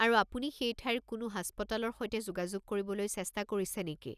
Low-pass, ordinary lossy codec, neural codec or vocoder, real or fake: 14.4 kHz; none; none; real